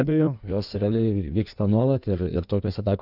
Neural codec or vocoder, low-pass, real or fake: codec, 16 kHz in and 24 kHz out, 1.1 kbps, FireRedTTS-2 codec; 5.4 kHz; fake